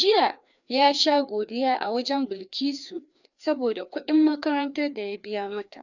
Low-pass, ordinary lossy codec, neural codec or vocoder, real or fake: 7.2 kHz; none; codec, 16 kHz, 2 kbps, FreqCodec, larger model; fake